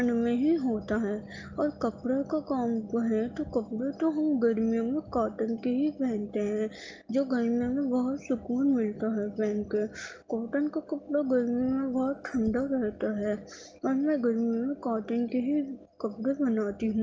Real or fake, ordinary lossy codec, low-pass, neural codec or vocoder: real; Opus, 24 kbps; 7.2 kHz; none